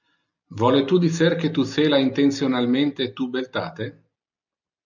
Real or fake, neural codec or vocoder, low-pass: real; none; 7.2 kHz